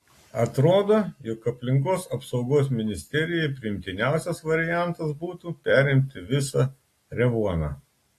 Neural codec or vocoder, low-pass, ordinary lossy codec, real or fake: none; 14.4 kHz; AAC, 48 kbps; real